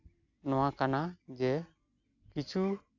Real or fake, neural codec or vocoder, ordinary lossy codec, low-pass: real; none; AAC, 48 kbps; 7.2 kHz